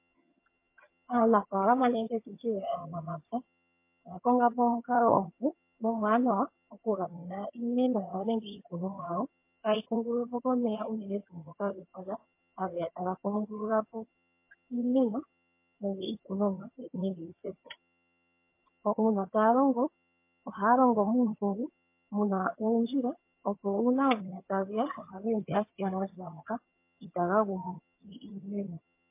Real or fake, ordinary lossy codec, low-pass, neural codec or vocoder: fake; MP3, 24 kbps; 3.6 kHz; vocoder, 22.05 kHz, 80 mel bands, HiFi-GAN